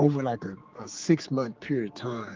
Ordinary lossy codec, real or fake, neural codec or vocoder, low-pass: Opus, 32 kbps; fake; codec, 16 kHz, 4 kbps, X-Codec, HuBERT features, trained on general audio; 7.2 kHz